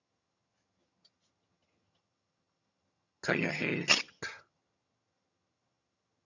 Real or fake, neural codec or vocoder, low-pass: fake; vocoder, 22.05 kHz, 80 mel bands, HiFi-GAN; 7.2 kHz